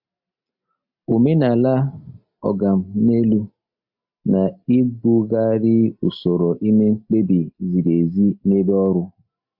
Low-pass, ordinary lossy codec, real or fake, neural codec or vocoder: 5.4 kHz; AAC, 48 kbps; real; none